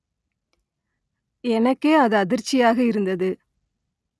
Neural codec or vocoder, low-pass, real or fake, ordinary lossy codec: none; none; real; none